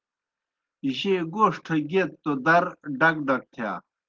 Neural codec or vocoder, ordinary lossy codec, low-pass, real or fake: none; Opus, 16 kbps; 7.2 kHz; real